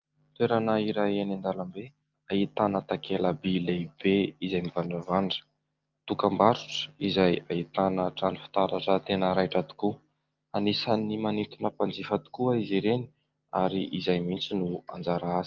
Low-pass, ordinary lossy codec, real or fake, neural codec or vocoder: 7.2 kHz; Opus, 32 kbps; real; none